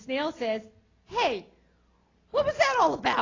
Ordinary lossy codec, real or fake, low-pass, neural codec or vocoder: AAC, 32 kbps; real; 7.2 kHz; none